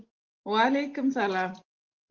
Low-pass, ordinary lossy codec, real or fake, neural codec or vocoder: 7.2 kHz; Opus, 16 kbps; real; none